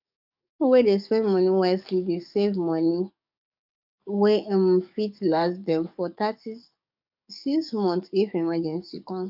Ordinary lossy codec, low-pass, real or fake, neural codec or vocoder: none; 5.4 kHz; fake; codec, 44.1 kHz, 7.8 kbps, DAC